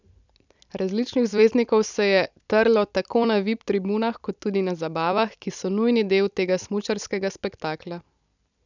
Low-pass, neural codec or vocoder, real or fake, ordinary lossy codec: 7.2 kHz; vocoder, 44.1 kHz, 128 mel bands every 256 samples, BigVGAN v2; fake; none